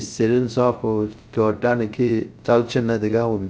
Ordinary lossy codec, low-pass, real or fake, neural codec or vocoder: none; none; fake; codec, 16 kHz, 0.3 kbps, FocalCodec